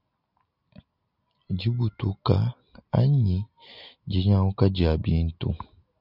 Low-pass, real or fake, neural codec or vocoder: 5.4 kHz; real; none